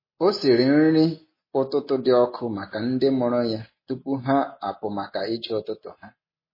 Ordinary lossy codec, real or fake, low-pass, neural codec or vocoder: MP3, 24 kbps; real; 5.4 kHz; none